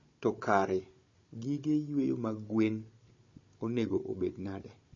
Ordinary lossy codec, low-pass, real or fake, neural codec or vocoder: MP3, 32 kbps; 7.2 kHz; fake; codec, 16 kHz, 16 kbps, FunCodec, trained on Chinese and English, 50 frames a second